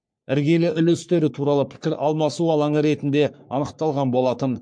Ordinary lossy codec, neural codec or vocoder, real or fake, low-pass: MP3, 64 kbps; codec, 44.1 kHz, 3.4 kbps, Pupu-Codec; fake; 9.9 kHz